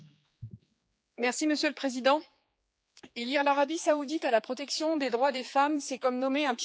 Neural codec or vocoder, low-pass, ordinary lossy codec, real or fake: codec, 16 kHz, 4 kbps, X-Codec, HuBERT features, trained on general audio; none; none; fake